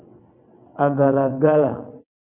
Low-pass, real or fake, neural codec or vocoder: 3.6 kHz; fake; vocoder, 22.05 kHz, 80 mel bands, WaveNeXt